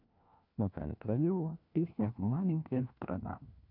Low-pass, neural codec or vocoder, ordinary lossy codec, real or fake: 5.4 kHz; codec, 16 kHz, 1 kbps, FunCodec, trained on LibriTTS, 50 frames a second; none; fake